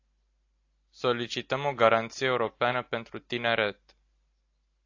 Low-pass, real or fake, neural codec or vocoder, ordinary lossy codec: 7.2 kHz; real; none; AAC, 48 kbps